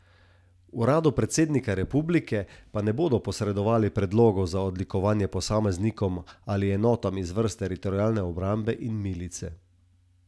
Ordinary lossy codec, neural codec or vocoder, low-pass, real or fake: none; none; none; real